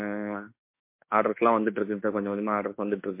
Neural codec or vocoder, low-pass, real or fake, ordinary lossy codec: autoencoder, 48 kHz, 32 numbers a frame, DAC-VAE, trained on Japanese speech; 3.6 kHz; fake; MP3, 24 kbps